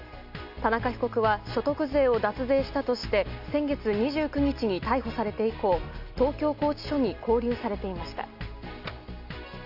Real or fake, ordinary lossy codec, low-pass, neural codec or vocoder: real; none; 5.4 kHz; none